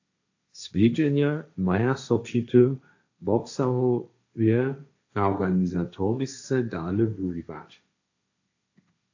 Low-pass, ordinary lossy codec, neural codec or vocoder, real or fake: 7.2 kHz; AAC, 48 kbps; codec, 16 kHz, 1.1 kbps, Voila-Tokenizer; fake